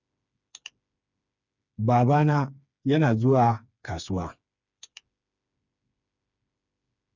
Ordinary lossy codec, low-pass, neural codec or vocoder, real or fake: none; 7.2 kHz; codec, 16 kHz, 4 kbps, FreqCodec, smaller model; fake